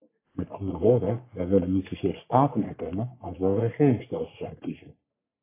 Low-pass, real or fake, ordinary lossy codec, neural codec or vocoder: 3.6 kHz; fake; AAC, 24 kbps; codec, 44.1 kHz, 3.4 kbps, Pupu-Codec